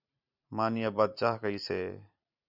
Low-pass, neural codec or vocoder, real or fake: 5.4 kHz; vocoder, 44.1 kHz, 128 mel bands every 512 samples, BigVGAN v2; fake